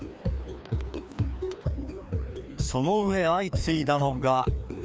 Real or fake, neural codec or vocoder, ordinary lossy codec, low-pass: fake; codec, 16 kHz, 2 kbps, FreqCodec, larger model; none; none